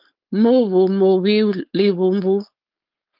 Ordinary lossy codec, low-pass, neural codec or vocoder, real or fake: Opus, 24 kbps; 5.4 kHz; codec, 16 kHz, 4.8 kbps, FACodec; fake